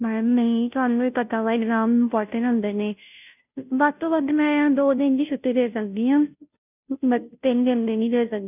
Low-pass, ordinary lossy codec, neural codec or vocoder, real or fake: 3.6 kHz; none; codec, 16 kHz, 0.5 kbps, FunCodec, trained on Chinese and English, 25 frames a second; fake